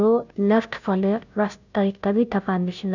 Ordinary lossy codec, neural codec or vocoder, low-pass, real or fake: none; codec, 16 kHz, 0.5 kbps, FunCodec, trained on Chinese and English, 25 frames a second; 7.2 kHz; fake